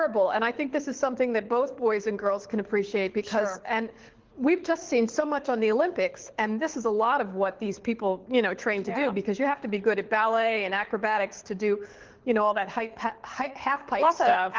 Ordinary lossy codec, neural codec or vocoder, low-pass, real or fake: Opus, 16 kbps; codec, 24 kHz, 6 kbps, HILCodec; 7.2 kHz; fake